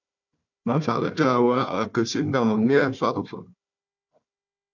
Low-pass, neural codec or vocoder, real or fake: 7.2 kHz; codec, 16 kHz, 1 kbps, FunCodec, trained on Chinese and English, 50 frames a second; fake